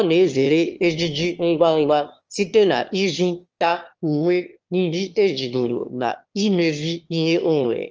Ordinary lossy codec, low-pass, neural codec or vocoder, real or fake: Opus, 24 kbps; 7.2 kHz; autoencoder, 22.05 kHz, a latent of 192 numbers a frame, VITS, trained on one speaker; fake